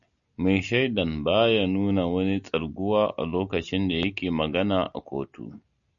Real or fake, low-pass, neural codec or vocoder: real; 7.2 kHz; none